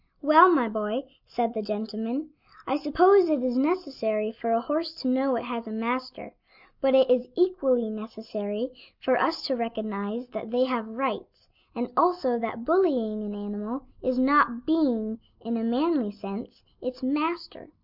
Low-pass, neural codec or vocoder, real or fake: 5.4 kHz; none; real